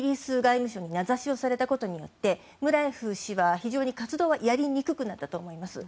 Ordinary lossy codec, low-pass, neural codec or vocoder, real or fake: none; none; none; real